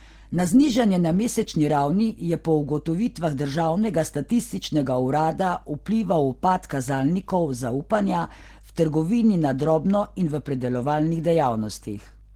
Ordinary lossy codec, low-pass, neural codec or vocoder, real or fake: Opus, 16 kbps; 19.8 kHz; none; real